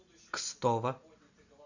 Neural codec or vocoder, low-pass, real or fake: none; 7.2 kHz; real